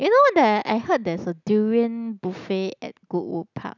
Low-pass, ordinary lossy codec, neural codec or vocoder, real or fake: 7.2 kHz; none; none; real